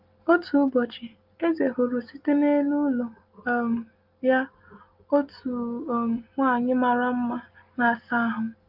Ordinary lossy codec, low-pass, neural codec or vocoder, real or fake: Opus, 32 kbps; 5.4 kHz; none; real